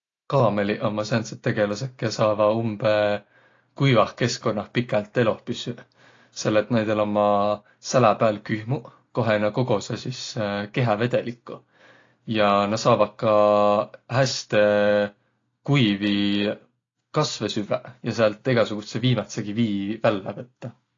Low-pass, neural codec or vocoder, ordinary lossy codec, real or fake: 7.2 kHz; none; AAC, 32 kbps; real